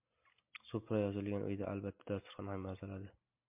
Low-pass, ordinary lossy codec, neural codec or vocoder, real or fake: 3.6 kHz; MP3, 32 kbps; none; real